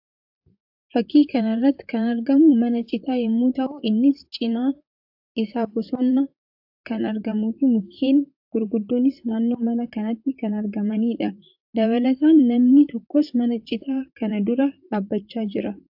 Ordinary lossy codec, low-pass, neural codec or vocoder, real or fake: AAC, 48 kbps; 5.4 kHz; vocoder, 44.1 kHz, 128 mel bands, Pupu-Vocoder; fake